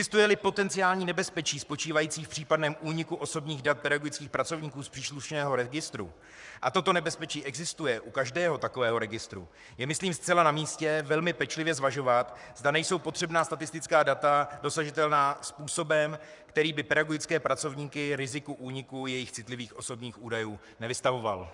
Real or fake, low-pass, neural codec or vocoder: fake; 10.8 kHz; codec, 44.1 kHz, 7.8 kbps, Pupu-Codec